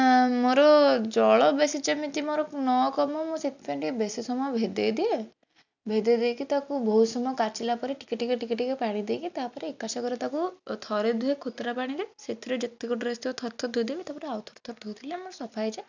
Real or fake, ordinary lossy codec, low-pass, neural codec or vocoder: real; none; 7.2 kHz; none